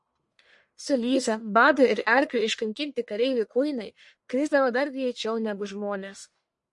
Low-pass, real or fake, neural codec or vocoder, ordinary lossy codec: 10.8 kHz; fake; codec, 44.1 kHz, 1.7 kbps, Pupu-Codec; MP3, 48 kbps